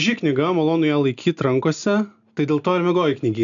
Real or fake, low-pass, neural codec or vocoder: real; 7.2 kHz; none